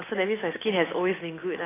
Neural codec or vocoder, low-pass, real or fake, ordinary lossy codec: none; 3.6 kHz; real; AAC, 16 kbps